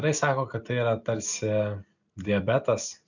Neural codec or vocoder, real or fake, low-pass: none; real; 7.2 kHz